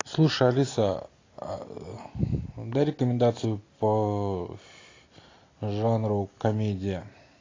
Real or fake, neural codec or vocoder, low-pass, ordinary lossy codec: real; none; 7.2 kHz; AAC, 32 kbps